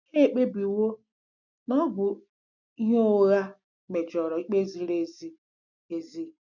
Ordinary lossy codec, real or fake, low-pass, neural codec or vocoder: none; real; 7.2 kHz; none